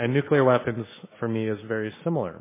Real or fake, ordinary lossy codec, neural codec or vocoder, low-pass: fake; MP3, 16 kbps; autoencoder, 48 kHz, 128 numbers a frame, DAC-VAE, trained on Japanese speech; 3.6 kHz